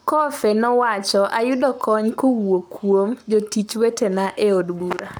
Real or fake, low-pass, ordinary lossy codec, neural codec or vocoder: fake; none; none; codec, 44.1 kHz, 7.8 kbps, Pupu-Codec